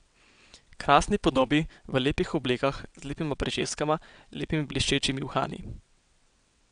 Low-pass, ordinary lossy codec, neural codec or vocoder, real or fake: 9.9 kHz; none; vocoder, 22.05 kHz, 80 mel bands, WaveNeXt; fake